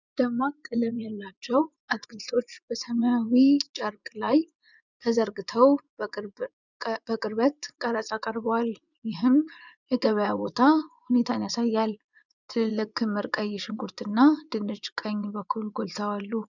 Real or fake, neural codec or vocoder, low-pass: fake; vocoder, 24 kHz, 100 mel bands, Vocos; 7.2 kHz